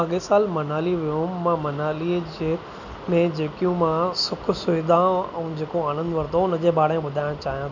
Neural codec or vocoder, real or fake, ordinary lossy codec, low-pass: none; real; none; 7.2 kHz